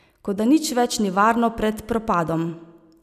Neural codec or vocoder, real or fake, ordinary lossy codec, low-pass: none; real; none; 14.4 kHz